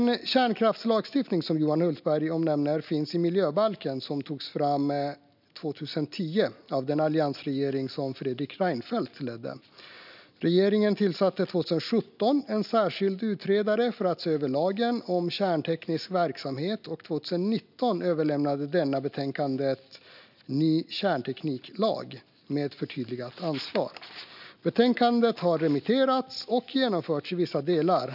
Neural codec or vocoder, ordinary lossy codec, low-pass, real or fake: none; none; 5.4 kHz; real